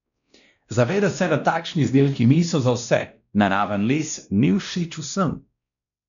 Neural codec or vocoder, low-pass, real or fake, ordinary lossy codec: codec, 16 kHz, 1 kbps, X-Codec, WavLM features, trained on Multilingual LibriSpeech; 7.2 kHz; fake; none